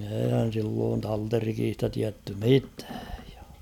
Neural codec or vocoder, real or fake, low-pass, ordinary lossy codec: none; real; 19.8 kHz; none